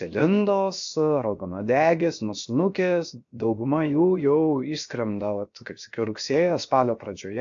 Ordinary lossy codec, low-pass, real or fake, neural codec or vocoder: AAC, 64 kbps; 7.2 kHz; fake; codec, 16 kHz, 0.7 kbps, FocalCodec